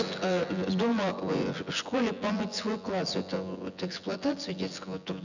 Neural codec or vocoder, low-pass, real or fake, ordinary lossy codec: vocoder, 24 kHz, 100 mel bands, Vocos; 7.2 kHz; fake; none